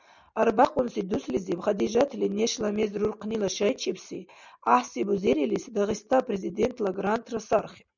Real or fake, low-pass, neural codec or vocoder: real; 7.2 kHz; none